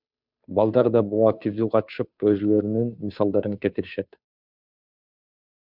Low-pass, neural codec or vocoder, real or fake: 5.4 kHz; codec, 16 kHz, 2 kbps, FunCodec, trained on Chinese and English, 25 frames a second; fake